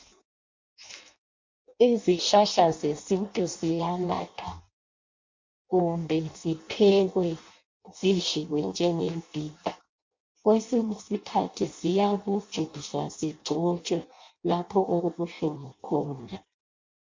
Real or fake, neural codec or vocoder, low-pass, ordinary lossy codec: fake; codec, 16 kHz in and 24 kHz out, 0.6 kbps, FireRedTTS-2 codec; 7.2 kHz; MP3, 48 kbps